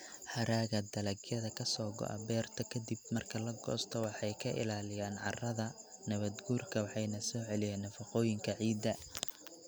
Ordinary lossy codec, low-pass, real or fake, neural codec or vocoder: none; none; real; none